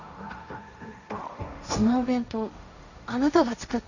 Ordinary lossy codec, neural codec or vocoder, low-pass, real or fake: none; codec, 16 kHz, 1.1 kbps, Voila-Tokenizer; 7.2 kHz; fake